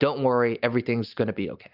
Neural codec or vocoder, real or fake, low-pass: none; real; 5.4 kHz